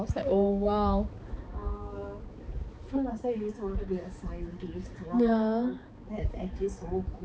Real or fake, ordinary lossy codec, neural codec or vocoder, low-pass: fake; none; codec, 16 kHz, 4 kbps, X-Codec, HuBERT features, trained on balanced general audio; none